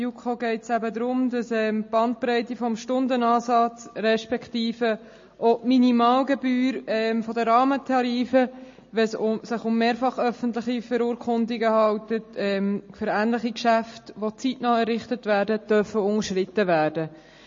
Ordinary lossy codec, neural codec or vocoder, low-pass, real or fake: MP3, 32 kbps; none; 7.2 kHz; real